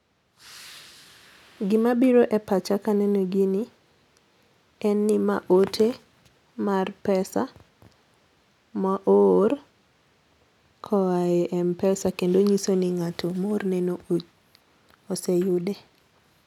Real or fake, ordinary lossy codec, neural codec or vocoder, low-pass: real; none; none; 19.8 kHz